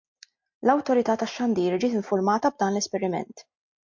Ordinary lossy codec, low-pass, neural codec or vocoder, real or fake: MP3, 48 kbps; 7.2 kHz; none; real